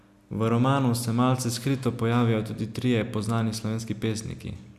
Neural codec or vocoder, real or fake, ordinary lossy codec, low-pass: none; real; none; 14.4 kHz